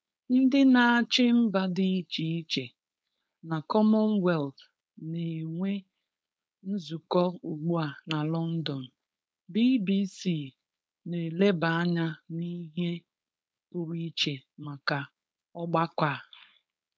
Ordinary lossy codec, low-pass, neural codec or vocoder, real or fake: none; none; codec, 16 kHz, 4.8 kbps, FACodec; fake